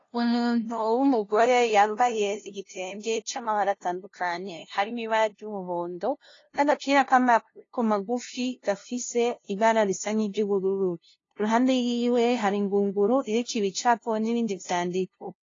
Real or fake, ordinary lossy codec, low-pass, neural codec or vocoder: fake; AAC, 32 kbps; 7.2 kHz; codec, 16 kHz, 0.5 kbps, FunCodec, trained on LibriTTS, 25 frames a second